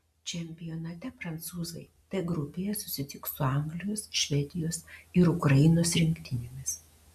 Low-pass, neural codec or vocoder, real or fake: 14.4 kHz; none; real